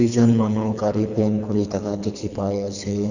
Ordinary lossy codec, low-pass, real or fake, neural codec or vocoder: none; 7.2 kHz; fake; codec, 24 kHz, 3 kbps, HILCodec